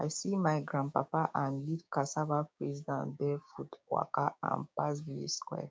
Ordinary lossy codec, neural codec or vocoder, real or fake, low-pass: none; codec, 16 kHz, 6 kbps, DAC; fake; none